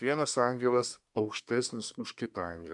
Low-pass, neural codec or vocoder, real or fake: 10.8 kHz; codec, 24 kHz, 1 kbps, SNAC; fake